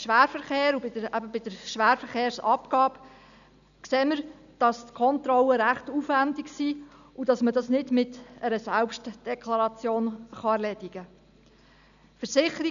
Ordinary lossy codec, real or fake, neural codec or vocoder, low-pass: none; real; none; 7.2 kHz